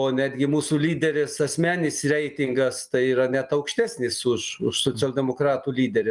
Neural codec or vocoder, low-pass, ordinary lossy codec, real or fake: none; 10.8 kHz; Opus, 32 kbps; real